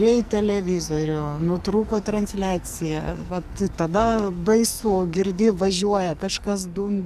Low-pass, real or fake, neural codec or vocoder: 14.4 kHz; fake; codec, 44.1 kHz, 2.6 kbps, SNAC